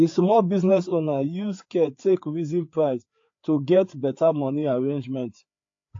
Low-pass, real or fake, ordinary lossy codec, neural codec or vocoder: 7.2 kHz; fake; AAC, 48 kbps; codec, 16 kHz, 4 kbps, FreqCodec, larger model